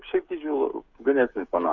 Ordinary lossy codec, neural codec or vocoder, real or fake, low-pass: Opus, 64 kbps; codec, 16 kHz, 8 kbps, FreqCodec, smaller model; fake; 7.2 kHz